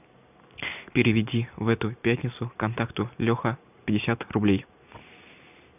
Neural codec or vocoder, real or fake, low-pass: none; real; 3.6 kHz